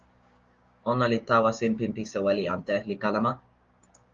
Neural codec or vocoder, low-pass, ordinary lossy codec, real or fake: codec, 16 kHz, 6 kbps, DAC; 7.2 kHz; Opus, 32 kbps; fake